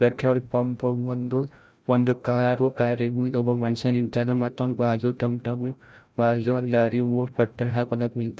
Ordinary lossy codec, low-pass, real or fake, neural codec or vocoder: none; none; fake; codec, 16 kHz, 0.5 kbps, FreqCodec, larger model